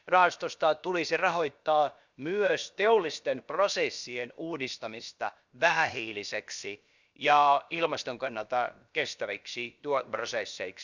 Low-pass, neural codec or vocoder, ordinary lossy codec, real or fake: 7.2 kHz; codec, 16 kHz, about 1 kbps, DyCAST, with the encoder's durations; Opus, 64 kbps; fake